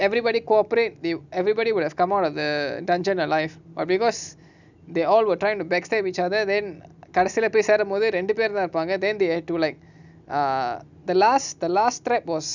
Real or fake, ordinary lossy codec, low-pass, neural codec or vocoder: real; none; 7.2 kHz; none